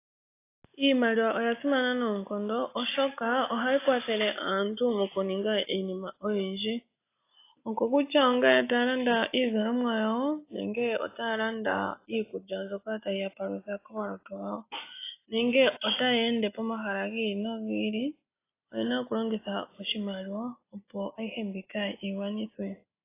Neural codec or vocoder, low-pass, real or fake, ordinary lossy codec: none; 3.6 kHz; real; AAC, 24 kbps